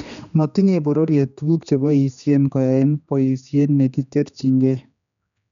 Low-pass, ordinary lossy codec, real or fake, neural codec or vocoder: 7.2 kHz; none; fake; codec, 16 kHz, 2 kbps, X-Codec, HuBERT features, trained on general audio